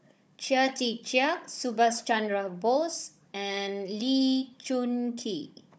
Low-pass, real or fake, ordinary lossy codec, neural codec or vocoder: none; fake; none; codec, 16 kHz, 16 kbps, FunCodec, trained on Chinese and English, 50 frames a second